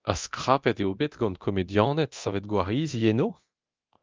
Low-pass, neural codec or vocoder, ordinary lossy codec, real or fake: 7.2 kHz; codec, 24 kHz, 0.9 kbps, DualCodec; Opus, 24 kbps; fake